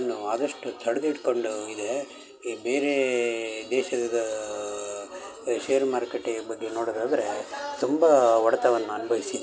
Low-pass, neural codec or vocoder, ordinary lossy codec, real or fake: none; none; none; real